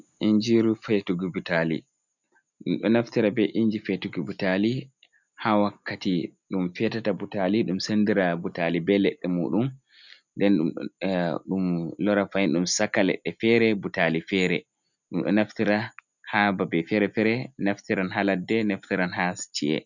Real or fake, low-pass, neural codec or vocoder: real; 7.2 kHz; none